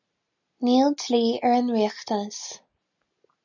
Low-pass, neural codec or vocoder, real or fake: 7.2 kHz; none; real